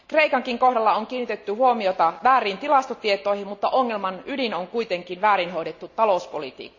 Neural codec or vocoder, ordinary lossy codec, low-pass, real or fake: none; none; 7.2 kHz; real